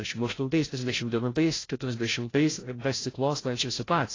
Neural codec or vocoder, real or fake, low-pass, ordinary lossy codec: codec, 16 kHz, 0.5 kbps, FreqCodec, larger model; fake; 7.2 kHz; AAC, 32 kbps